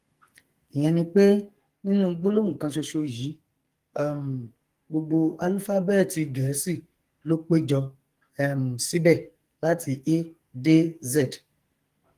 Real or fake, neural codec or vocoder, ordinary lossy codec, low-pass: fake; codec, 44.1 kHz, 2.6 kbps, SNAC; Opus, 24 kbps; 14.4 kHz